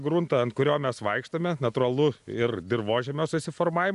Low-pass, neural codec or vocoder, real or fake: 10.8 kHz; none; real